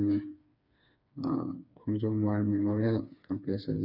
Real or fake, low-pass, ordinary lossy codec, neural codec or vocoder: fake; 5.4 kHz; none; codec, 16 kHz, 4 kbps, FreqCodec, smaller model